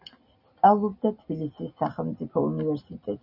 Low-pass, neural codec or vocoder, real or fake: 5.4 kHz; none; real